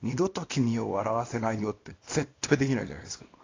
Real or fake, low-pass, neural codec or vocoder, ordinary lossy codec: fake; 7.2 kHz; codec, 24 kHz, 0.9 kbps, WavTokenizer, small release; AAC, 32 kbps